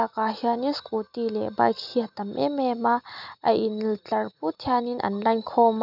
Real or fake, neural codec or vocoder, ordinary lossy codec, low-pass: real; none; none; 5.4 kHz